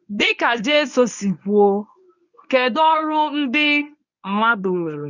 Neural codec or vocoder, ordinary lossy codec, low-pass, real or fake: codec, 24 kHz, 0.9 kbps, WavTokenizer, medium speech release version 1; none; 7.2 kHz; fake